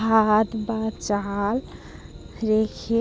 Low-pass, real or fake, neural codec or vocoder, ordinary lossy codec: none; real; none; none